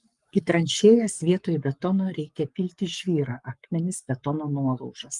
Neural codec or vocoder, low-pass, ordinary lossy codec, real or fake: codec, 44.1 kHz, 7.8 kbps, Pupu-Codec; 10.8 kHz; Opus, 32 kbps; fake